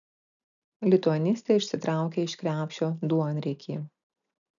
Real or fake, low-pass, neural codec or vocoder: real; 7.2 kHz; none